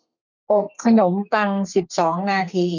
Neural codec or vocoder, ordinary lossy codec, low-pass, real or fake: codec, 32 kHz, 1.9 kbps, SNAC; none; 7.2 kHz; fake